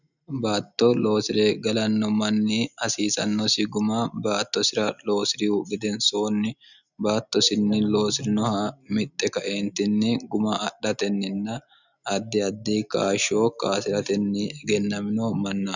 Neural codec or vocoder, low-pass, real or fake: none; 7.2 kHz; real